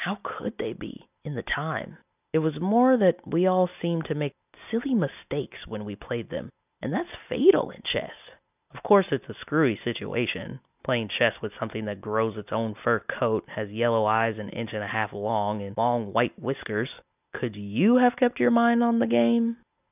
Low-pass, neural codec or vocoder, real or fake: 3.6 kHz; none; real